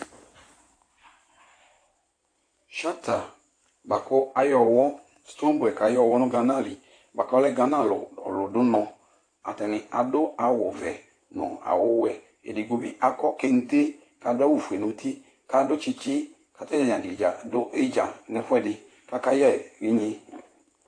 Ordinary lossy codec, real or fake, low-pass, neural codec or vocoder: AAC, 48 kbps; fake; 9.9 kHz; codec, 16 kHz in and 24 kHz out, 2.2 kbps, FireRedTTS-2 codec